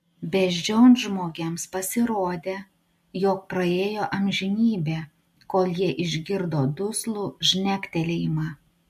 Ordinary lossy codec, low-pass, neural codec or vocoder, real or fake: MP3, 64 kbps; 14.4 kHz; none; real